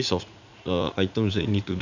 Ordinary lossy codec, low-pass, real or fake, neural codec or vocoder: none; 7.2 kHz; fake; vocoder, 44.1 kHz, 80 mel bands, Vocos